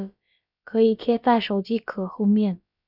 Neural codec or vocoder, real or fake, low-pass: codec, 16 kHz, about 1 kbps, DyCAST, with the encoder's durations; fake; 5.4 kHz